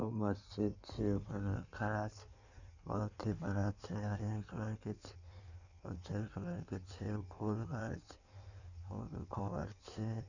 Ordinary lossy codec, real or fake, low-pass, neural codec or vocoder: none; fake; 7.2 kHz; codec, 16 kHz in and 24 kHz out, 1.1 kbps, FireRedTTS-2 codec